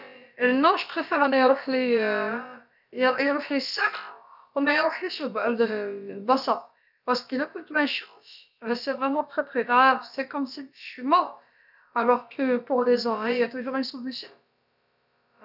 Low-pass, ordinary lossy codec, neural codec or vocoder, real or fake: 5.4 kHz; none; codec, 16 kHz, about 1 kbps, DyCAST, with the encoder's durations; fake